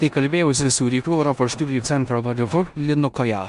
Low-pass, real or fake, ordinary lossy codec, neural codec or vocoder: 10.8 kHz; fake; Opus, 64 kbps; codec, 16 kHz in and 24 kHz out, 0.9 kbps, LongCat-Audio-Codec, four codebook decoder